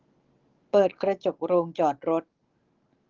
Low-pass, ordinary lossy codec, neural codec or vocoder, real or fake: 7.2 kHz; Opus, 16 kbps; none; real